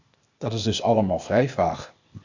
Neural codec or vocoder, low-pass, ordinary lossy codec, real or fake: codec, 16 kHz, 0.8 kbps, ZipCodec; 7.2 kHz; Opus, 64 kbps; fake